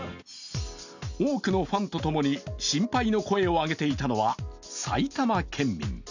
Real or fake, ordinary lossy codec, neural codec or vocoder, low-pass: real; none; none; 7.2 kHz